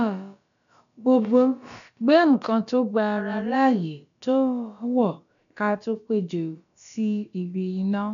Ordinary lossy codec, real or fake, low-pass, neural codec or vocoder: MP3, 96 kbps; fake; 7.2 kHz; codec, 16 kHz, about 1 kbps, DyCAST, with the encoder's durations